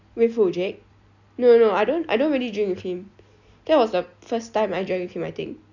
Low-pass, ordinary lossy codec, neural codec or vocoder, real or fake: 7.2 kHz; none; none; real